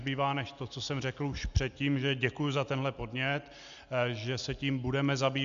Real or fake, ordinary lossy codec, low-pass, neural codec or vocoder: real; AAC, 96 kbps; 7.2 kHz; none